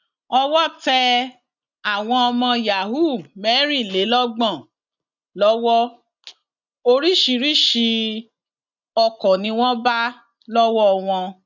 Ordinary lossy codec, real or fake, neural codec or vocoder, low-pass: none; real; none; 7.2 kHz